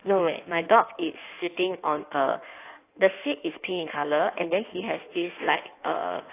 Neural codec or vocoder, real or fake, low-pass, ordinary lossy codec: codec, 16 kHz in and 24 kHz out, 1.1 kbps, FireRedTTS-2 codec; fake; 3.6 kHz; AAC, 24 kbps